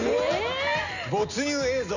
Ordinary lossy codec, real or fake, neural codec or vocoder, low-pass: none; real; none; 7.2 kHz